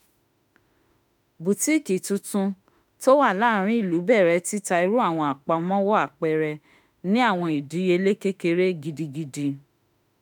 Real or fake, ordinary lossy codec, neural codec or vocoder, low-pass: fake; none; autoencoder, 48 kHz, 32 numbers a frame, DAC-VAE, trained on Japanese speech; none